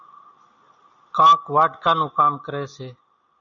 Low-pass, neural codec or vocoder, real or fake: 7.2 kHz; none; real